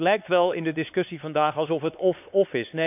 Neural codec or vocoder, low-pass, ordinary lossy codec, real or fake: codec, 16 kHz, 4 kbps, X-Codec, HuBERT features, trained on LibriSpeech; 3.6 kHz; none; fake